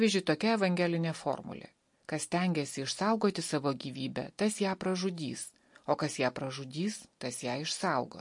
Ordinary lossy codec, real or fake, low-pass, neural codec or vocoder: MP3, 48 kbps; real; 10.8 kHz; none